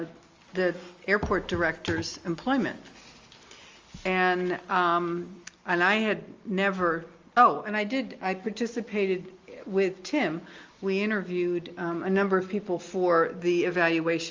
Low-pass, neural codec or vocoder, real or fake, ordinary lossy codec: 7.2 kHz; none; real; Opus, 32 kbps